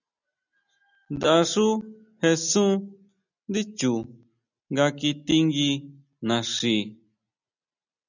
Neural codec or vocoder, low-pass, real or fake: none; 7.2 kHz; real